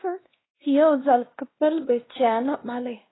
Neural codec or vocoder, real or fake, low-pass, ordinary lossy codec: codec, 16 kHz, 1 kbps, X-Codec, WavLM features, trained on Multilingual LibriSpeech; fake; 7.2 kHz; AAC, 16 kbps